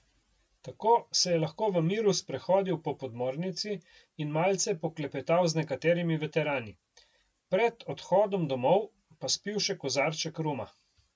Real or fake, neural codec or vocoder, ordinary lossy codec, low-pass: real; none; none; none